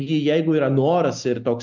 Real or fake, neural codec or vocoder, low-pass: real; none; 7.2 kHz